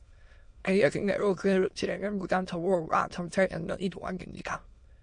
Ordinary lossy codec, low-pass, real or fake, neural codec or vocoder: MP3, 48 kbps; 9.9 kHz; fake; autoencoder, 22.05 kHz, a latent of 192 numbers a frame, VITS, trained on many speakers